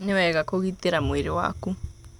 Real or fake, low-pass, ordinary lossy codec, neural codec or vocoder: real; 19.8 kHz; none; none